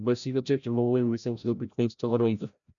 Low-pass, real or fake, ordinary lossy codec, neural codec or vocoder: 7.2 kHz; fake; none; codec, 16 kHz, 0.5 kbps, FreqCodec, larger model